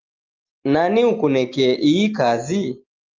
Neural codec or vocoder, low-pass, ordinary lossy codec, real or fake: none; 7.2 kHz; Opus, 32 kbps; real